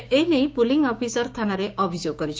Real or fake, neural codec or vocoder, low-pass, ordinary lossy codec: fake; codec, 16 kHz, 6 kbps, DAC; none; none